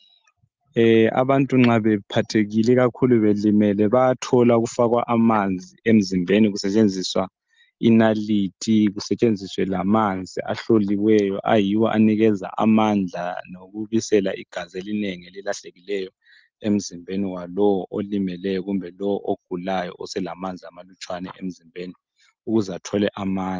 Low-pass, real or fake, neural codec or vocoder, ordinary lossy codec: 7.2 kHz; real; none; Opus, 32 kbps